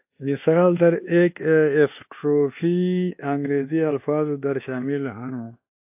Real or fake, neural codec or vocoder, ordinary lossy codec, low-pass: fake; codec, 24 kHz, 1.2 kbps, DualCodec; AAC, 32 kbps; 3.6 kHz